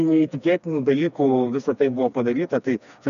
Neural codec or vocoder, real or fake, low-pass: codec, 16 kHz, 2 kbps, FreqCodec, smaller model; fake; 7.2 kHz